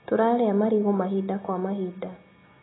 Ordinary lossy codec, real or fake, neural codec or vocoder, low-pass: AAC, 16 kbps; real; none; 7.2 kHz